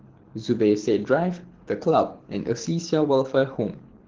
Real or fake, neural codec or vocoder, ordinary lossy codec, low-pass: fake; codec, 24 kHz, 6 kbps, HILCodec; Opus, 16 kbps; 7.2 kHz